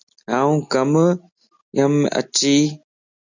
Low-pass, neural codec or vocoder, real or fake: 7.2 kHz; none; real